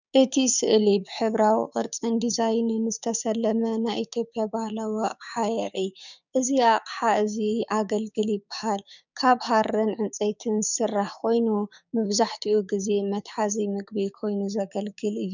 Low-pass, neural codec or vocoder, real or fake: 7.2 kHz; codec, 16 kHz, 6 kbps, DAC; fake